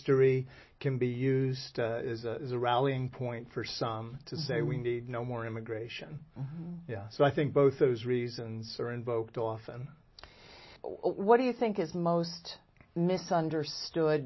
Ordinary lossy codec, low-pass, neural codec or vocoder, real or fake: MP3, 24 kbps; 7.2 kHz; none; real